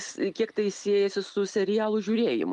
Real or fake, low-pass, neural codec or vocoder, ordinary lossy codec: real; 10.8 kHz; none; Opus, 32 kbps